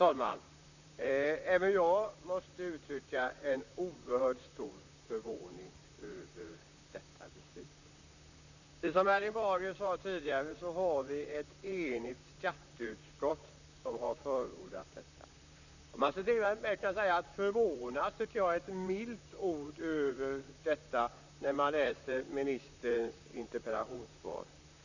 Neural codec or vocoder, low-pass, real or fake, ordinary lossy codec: vocoder, 44.1 kHz, 128 mel bands, Pupu-Vocoder; 7.2 kHz; fake; none